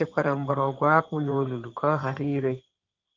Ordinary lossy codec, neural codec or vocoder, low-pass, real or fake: Opus, 24 kbps; codec, 16 kHz in and 24 kHz out, 2.2 kbps, FireRedTTS-2 codec; 7.2 kHz; fake